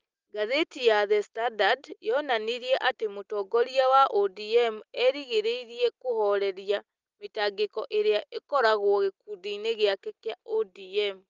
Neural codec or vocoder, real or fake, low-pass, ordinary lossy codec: none; real; 7.2 kHz; Opus, 32 kbps